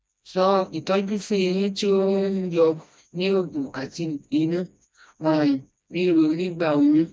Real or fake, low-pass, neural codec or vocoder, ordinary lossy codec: fake; none; codec, 16 kHz, 1 kbps, FreqCodec, smaller model; none